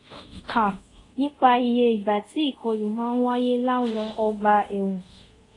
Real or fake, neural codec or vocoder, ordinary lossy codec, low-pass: fake; codec, 24 kHz, 0.5 kbps, DualCodec; AAC, 32 kbps; 10.8 kHz